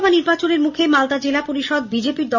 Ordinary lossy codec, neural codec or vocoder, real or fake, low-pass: none; none; real; 7.2 kHz